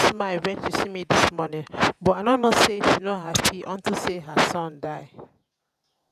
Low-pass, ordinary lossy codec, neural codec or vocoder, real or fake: 14.4 kHz; none; vocoder, 48 kHz, 128 mel bands, Vocos; fake